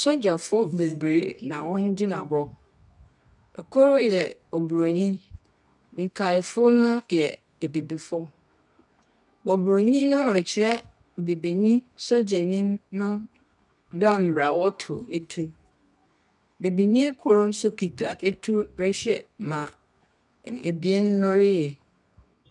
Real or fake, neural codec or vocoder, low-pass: fake; codec, 24 kHz, 0.9 kbps, WavTokenizer, medium music audio release; 10.8 kHz